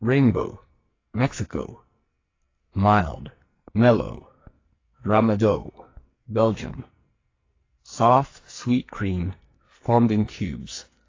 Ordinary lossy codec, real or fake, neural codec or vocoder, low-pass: AAC, 32 kbps; fake; codec, 44.1 kHz, 2.6 kbps, SNAC; 7.2 kHz